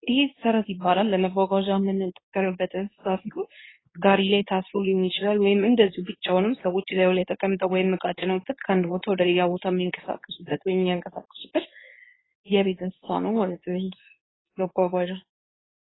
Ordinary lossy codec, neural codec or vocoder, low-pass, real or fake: AAC, 16 kbps; codec, 24 kHz, 0.9 kbps, WavTokenizer, medium speech release version 2; 7.2 kHz; fake